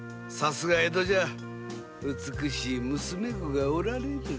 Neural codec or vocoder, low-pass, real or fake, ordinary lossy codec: none; none; real; none